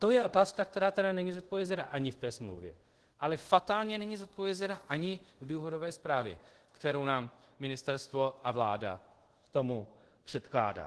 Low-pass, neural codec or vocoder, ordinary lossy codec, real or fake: 10.8 kHz; codec, 24 kHz, 0.5 kbps, DualCodec; Opus, 16 kbps; fake